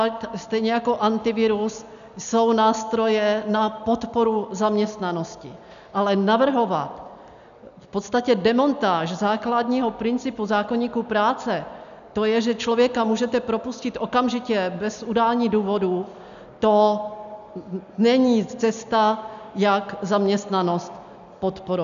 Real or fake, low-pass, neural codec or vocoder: real; 7.2 kHz; none